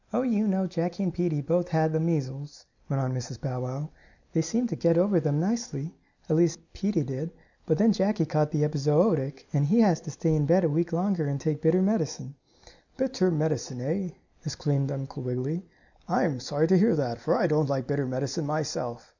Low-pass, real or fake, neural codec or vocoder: 7.2 kHz; real; none